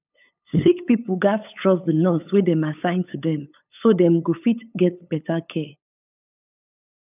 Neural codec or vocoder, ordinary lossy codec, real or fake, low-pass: codec, 16 kHz, 8 kbps, FunCodec, trained on LibriTTS, 25 frames a second; none; fake; 3.6 kHz